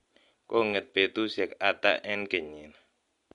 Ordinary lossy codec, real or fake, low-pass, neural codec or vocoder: MP3, 64 kbps; real; 10.8 kHz; none